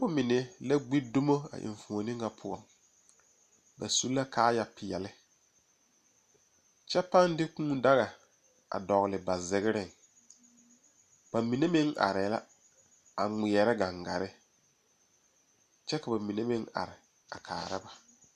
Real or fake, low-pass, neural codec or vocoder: real; 14.4 kHz; none